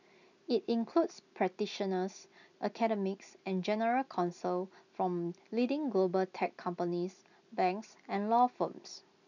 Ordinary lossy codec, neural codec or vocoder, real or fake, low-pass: none; none; real; 7.2 kHz